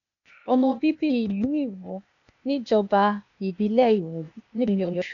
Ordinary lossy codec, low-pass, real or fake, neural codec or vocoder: none; 7.2 kHz; fake; codec, 16 kHz, 0.8 kbps, ZipCodec